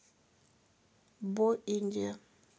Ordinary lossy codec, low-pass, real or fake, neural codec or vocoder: none; none; real; none